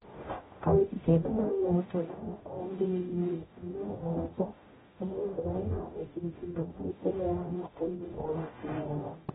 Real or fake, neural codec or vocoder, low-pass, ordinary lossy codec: fake; codec, 44.1 kHz, 0.9 kbps, DAC; 19.8 kHz; AAC, 16 kbps